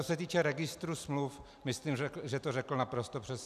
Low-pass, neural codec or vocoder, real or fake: 14.4 kHz; none; real